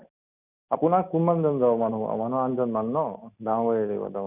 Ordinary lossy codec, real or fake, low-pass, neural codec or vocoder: none; real; 3.6 kHz; none